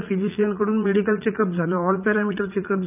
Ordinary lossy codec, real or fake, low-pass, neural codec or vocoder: none; fake; 3.6 kHz; vocoder, 44.1 kHz, 80 mel bands, Vocos